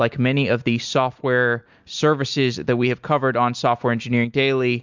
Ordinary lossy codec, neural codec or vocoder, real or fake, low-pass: MP3, 64 kbps; none; real; 7.2 kHz